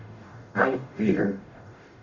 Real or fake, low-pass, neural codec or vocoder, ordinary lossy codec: fake; 7.2 kHz; codec, 44.1 kHz, 0.9 kbps, DAC; none